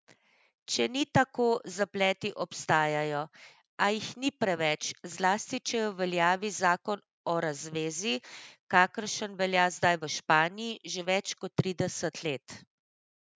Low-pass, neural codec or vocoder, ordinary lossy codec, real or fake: none; none; none; real